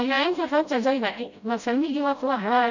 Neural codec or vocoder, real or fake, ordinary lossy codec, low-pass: codec, 16 kHz, 0.5 kbps, FreqCodec, smaller model; fake; none; 7.2 kHz